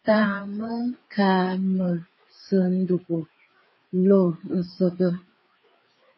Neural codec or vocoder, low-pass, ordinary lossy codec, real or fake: codec, 16 kHz, 4 kbps, FreqCodec, larger model; 7.2 kHz; MP3, 24 kbps; fake